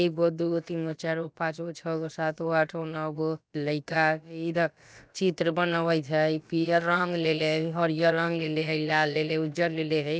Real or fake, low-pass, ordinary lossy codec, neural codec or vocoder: fake; none; none; codec, 16 kHz, about 1 kbps, DyCAST, with the encoder's durations